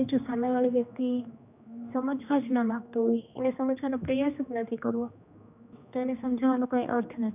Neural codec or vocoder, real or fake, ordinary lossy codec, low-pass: codec, 16 kHz, 2 kbps, X-Codec, HuBERT features, trained on general audio; fake; none; 3.6 kHz